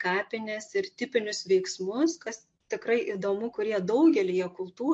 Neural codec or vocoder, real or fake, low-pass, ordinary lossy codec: none; real; 9.9 kHz; MP3, 48 kbps